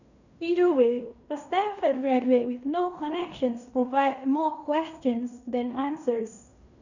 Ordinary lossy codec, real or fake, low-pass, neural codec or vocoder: none; fake; 7.2 kHz; codec, 16 kHz in and 24 kHz out, 0.9 kbps, LongCat-Audio-Codec, fine tuned four codebook decoder